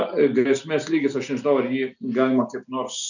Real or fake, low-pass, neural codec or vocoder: real; 7.2 kHz; none